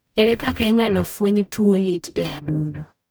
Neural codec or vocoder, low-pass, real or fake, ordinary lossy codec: codec, 44.1 kHz, 0.9 kbps, DAC; none; fake; none